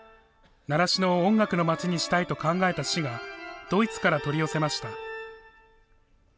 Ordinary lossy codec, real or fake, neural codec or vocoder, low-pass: none; real; none; none